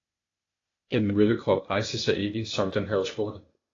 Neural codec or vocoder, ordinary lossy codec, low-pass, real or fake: codec, 16 kHz, 0.8 kbps, ZipCodec; AAC, 32 kbps; 7.2 kHz; fake